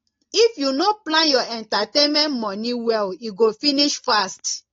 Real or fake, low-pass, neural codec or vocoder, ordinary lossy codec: real; 7.2 kHz; none; AAC, 32 kbps